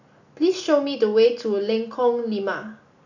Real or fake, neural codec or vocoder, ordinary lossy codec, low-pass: real; none; none; 7.2 kHz